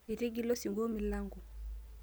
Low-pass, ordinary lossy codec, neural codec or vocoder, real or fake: none; none; none; real